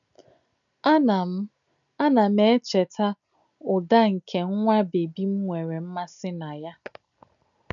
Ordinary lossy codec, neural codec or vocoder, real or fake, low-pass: none; none; real; 7.2 kHz